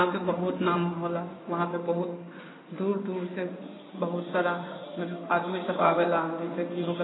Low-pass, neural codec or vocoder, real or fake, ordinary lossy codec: 7.2 kHz; codec, 16 kHz in and 24 kHz out, 2.2 kbps, FireRedTTS-2 codec; fake; AAC, 16 kbps